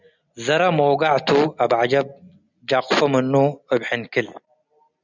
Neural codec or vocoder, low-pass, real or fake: none; 7.2 kHz; real